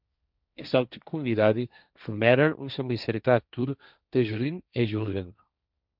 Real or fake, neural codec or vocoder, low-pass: fake; codec, 16 kHz, 1.1 kbps, Voila-Tokenizer; 5.4 kHz